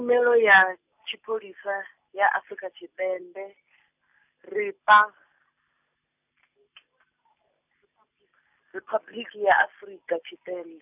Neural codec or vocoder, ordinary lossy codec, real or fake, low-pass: none; none; real; 3.6 kHz